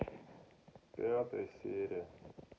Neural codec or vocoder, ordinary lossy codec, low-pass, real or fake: none; none; none; real